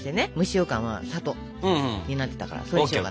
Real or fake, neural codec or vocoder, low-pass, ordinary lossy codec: real; none; none; none